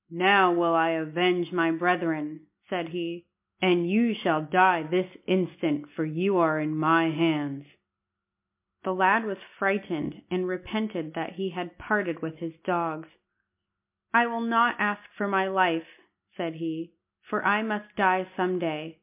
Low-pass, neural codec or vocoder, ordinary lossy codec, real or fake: 3.6 kHz; none; MP3, 32 kbps; real